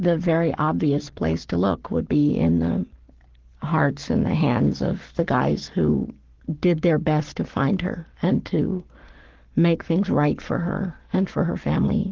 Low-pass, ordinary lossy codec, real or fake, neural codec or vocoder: 7.2 kHz; Opus, 16 kbps; fake; codec, 44.1 kHz, 7.8 kbps, Pupu-Codec